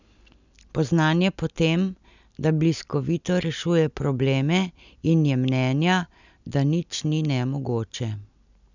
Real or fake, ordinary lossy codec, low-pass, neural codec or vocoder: real; none; 7.2 kHz; none